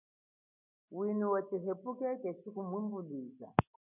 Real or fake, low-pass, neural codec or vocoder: real; 3.6 kHz; none